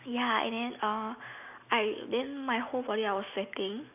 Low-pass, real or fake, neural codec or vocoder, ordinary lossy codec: 3.6 kHz; real; none; none